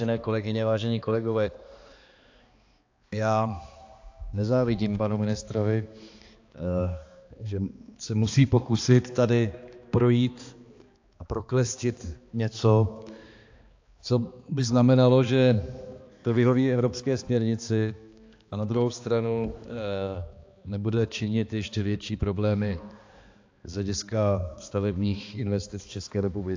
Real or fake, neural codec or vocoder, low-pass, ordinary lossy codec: fake; codec, 16 kHz, 2 kbps, X-Codec, HuBERT features, trained on balanced general audio; 7.2 kHz; AAC, 48 kbps